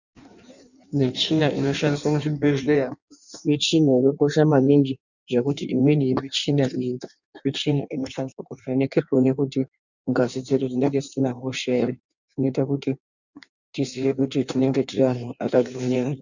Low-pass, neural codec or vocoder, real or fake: 7.2 kHz; codec, 16 kHz in and 24 kHz out, 1.1 kbps, FireRedTTS-2 codec; fake